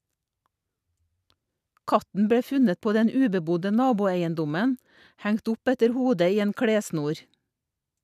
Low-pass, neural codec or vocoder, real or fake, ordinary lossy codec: 14.4 kHz; none; real; none